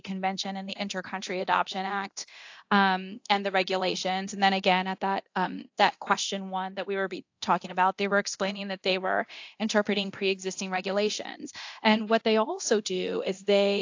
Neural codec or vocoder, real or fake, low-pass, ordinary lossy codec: codec, 24 kHz, 0.9 kbps, DualCodec; fake; 7.2 kHz; AAC, 48 kbps